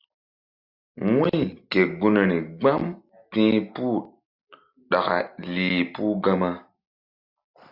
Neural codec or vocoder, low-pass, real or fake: none; 5.4 kHz; real